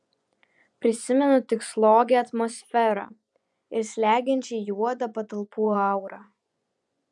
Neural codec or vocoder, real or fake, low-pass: vocoder, 24 kHz, 100 mel bands, Vocos; fake; 10.8 kHz